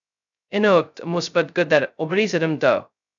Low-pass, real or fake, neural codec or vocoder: 7.2 kHz; fake; codec, 16 kHz, 0.2 kbps, FocalCodec